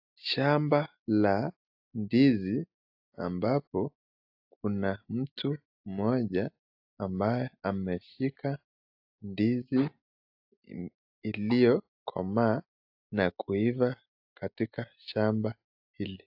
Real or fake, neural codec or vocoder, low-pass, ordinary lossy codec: real; none; 5.4 kHz; AAC, 48 kbps